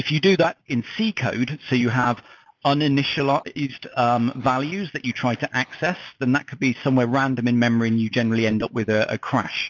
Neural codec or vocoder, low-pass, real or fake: none; 7.2 kHz; real